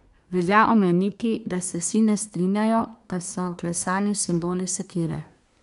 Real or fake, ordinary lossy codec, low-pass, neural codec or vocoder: fake; none; 10.8 kHz; codec, 24 kHz, 1 kbps, SNAC